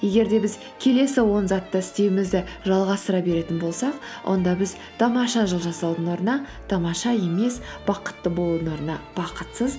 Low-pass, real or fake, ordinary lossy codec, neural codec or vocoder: none; real; none; none